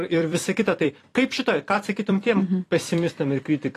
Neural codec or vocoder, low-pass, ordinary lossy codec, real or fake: vocoder, 44.1 kHz, 128 mel bands every 256 samples, BigVGAN v2; 14.4 kHz; AAC, 48 kbps; fake